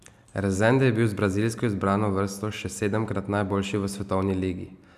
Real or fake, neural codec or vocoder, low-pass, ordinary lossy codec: real; none; 14.4 kHz; none